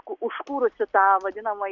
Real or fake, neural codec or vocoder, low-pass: real; none; 7.2 kHz